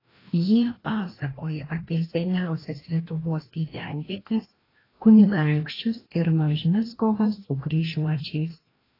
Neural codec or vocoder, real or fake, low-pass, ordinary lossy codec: codec, 16 kHz, 1 kbps, FreqCodec, larger model; fake; 5.4 kHz; AAC, 24 kbps